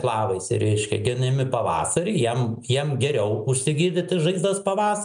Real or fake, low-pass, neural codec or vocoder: real; 9.9 kHz; none